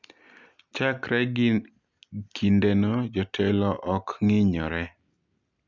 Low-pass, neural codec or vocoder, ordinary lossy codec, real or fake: 7.2 kHz; none; none; real